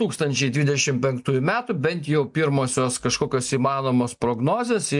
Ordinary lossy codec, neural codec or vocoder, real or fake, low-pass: MP3, 64 kbps; none; real; 10.8 kHz